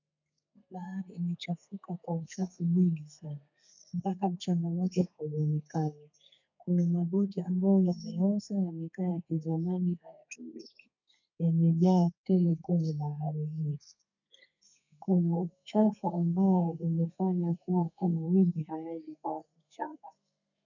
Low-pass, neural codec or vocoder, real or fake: 7.2 kHz; codec, 32 kHz, 1.9 kbps, SNAC; fake